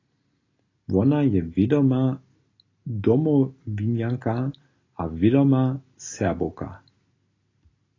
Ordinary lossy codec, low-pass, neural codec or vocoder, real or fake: AAC, 32 kbps; 7.2 kHz; none; real